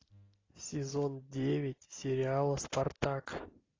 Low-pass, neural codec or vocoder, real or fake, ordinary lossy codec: 7.2 kHz; none; real; AAC, 32 kbps